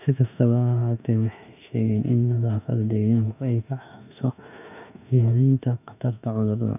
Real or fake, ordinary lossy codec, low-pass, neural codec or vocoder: fake; none; 3.6 kHz; autoencoder, 48 kHz, 32 numbers a frame, DAC-VAE, trained on Japanese speech